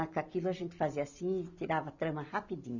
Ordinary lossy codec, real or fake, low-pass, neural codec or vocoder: none; real; 7.2 kHz; none